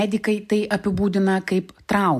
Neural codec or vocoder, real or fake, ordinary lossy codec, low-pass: none; real; AAC, 96 kbps; 14.4 kHz